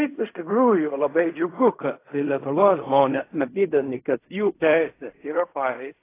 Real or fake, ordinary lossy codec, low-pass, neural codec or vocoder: fake; AAC, 24 kbps; 3.6 kHz; codec, 16 kHz in and 24 kHz out, 0.4 kbps, LongCat-Audio-Codec, fine tuned four codebook decoder